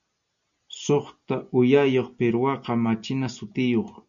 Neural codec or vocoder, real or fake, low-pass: none; real; 7.2 kHz